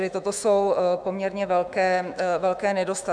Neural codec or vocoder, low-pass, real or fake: autoencoder, 48 kHz, 128 numbers a frame, DAC-VAE, trained on Japanese speech; 9.9 kHz; fake